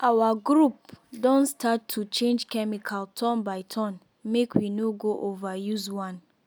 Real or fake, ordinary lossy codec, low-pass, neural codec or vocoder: real; none; none; none